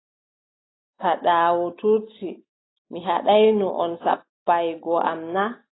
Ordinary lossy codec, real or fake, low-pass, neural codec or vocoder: AAC, 16 kbps; real; 7.2 kHz; none